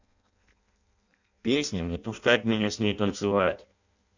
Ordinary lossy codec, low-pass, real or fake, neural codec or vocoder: none; 7.2 kHz; fake; codec, 16 kHz in and 24 kHz out, 0.6 kbps, FireRedTTS-2 codec